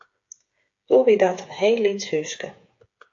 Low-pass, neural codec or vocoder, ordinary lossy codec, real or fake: 7.2 kHz; codec, 16 kHz, 8 kbps, FreqCodec, smaller model; AAC, 48 kbps; fake